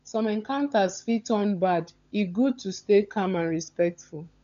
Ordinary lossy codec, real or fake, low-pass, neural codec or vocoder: none; fake; 7.2 kHz; codec, 16 kHz, 16 kbps, FunCodec, trained on LibriTTS, 50 frames a second